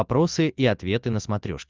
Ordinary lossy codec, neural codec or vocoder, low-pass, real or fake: Opus, 24 kbps; none; 7.2 kHz; real